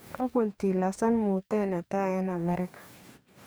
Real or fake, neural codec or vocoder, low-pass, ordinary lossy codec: fake; codec, 44.1 kHz, 2.6 kbps, DAC; none; none